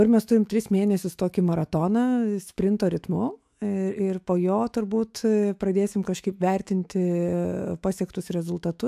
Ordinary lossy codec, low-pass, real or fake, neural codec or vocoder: AAC, 96 kbps; 14.4 kHz; fake; autoencoder, 48 kHz, 128 numbers a frame, DAC-VAE, trained on Japanese speech